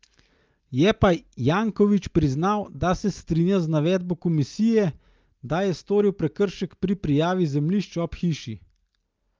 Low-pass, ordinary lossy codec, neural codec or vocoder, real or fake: 7.2 kHz; Opus, 24 kbps; none; real